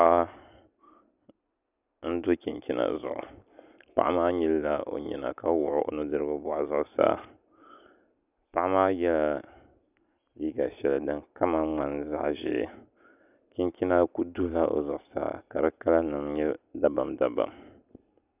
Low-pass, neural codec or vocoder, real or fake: 3.6 kHz; none; real